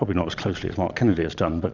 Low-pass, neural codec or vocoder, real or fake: 7.2 kHz; vocoder, 22.05 kHz, 80 mel bands, Vocos; fake